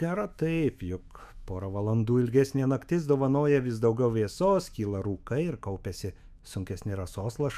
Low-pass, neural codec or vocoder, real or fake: 14.4 kHz; autoencoder, 48 kHz, 128 numbers a frame, DAC-VAE, trained on Japanese speech; fake